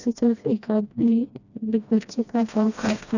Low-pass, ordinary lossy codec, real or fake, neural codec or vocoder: 7.2 kHz; none; fake; codec, 16 kHz, 1 kbps, FreqCodec, smaller model